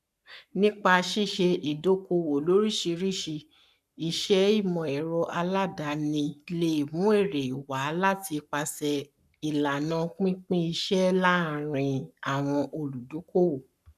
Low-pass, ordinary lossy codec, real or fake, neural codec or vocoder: 14.4 kHz; none; fake; codec, 44.1 kHz, 7.8 kbps, Pupu-Codec